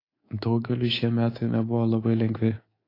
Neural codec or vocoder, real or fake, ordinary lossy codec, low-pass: codec, 24 kHz, 3.1 kbps, DualCodec; fake; AAC, 24 kbps; 5.4 kHz